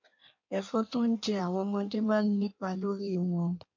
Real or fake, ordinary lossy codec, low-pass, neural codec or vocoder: fake; MP3, 48 kbps; 7.2 kHz; codec, 16 kHz in and 24 kHz out, 1.1 kbps, FireRedTTS-2 codec